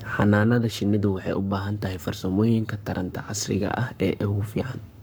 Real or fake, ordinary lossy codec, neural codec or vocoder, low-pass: fake; none; codec, 44.1 kHz, 7.8 kbps, Pupu-Codec; none